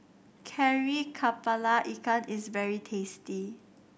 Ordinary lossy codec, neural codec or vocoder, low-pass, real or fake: none; none; none; real